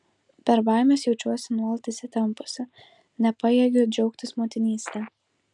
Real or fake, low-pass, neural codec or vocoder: real; 10.8 kHz; none